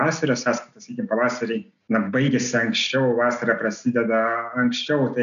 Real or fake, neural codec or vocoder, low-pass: real; none; 7.2 kHz